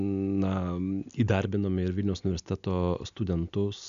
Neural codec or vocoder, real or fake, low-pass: none; real; 7.2 kHz